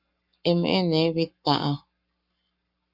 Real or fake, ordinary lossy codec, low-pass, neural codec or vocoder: fake; Opus, 64 kbps; 5.4 kHz; codec, 44.1 kHz, 7.8 kbps, Pupu-Codec